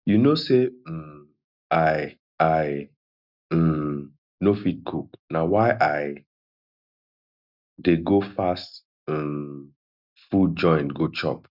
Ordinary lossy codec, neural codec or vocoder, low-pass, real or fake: none; none; 5.4 kHz; real